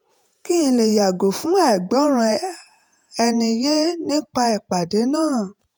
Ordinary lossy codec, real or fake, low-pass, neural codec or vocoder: none; fake; none; vocoder, 48 kHz, 128 mel bands, Vocos